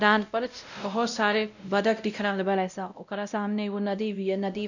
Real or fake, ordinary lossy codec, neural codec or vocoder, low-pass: fake; none; codec, 16 kHz, 0.5 kbps, X-Codec, WavLM features, trained on Multilingual LibriSpeech; 7.2 kHz